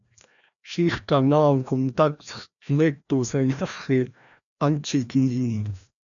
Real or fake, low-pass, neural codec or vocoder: fake; 7.2 kHz; codec, 16 kHz, 1 kbps, FreqCodec, larger model